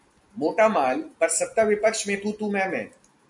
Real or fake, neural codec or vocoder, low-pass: real; none; 10.8 kHz